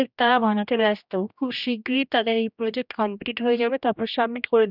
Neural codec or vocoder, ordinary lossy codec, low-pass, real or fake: codec, 16 kHz, 1 kbps, X-Codec, HuBERT features, trained on general audio; none; 5.4 kHz; fake